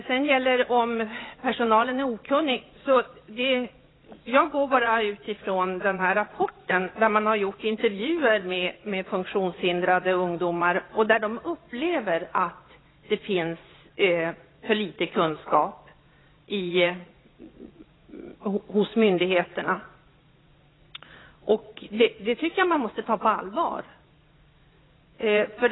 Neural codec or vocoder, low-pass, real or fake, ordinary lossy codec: vocoder, 22.05 kHz, 80 mel bands, Vocos; 7.2 kHz; fake; AAC, 16 kbps